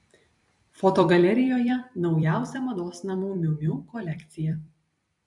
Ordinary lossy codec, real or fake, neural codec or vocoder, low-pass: MP3, 96 kbps; fake; vocoder, 44.1 kHz, 128 mel bands every 256 samples, BigVGAN v2; 10.8 kHz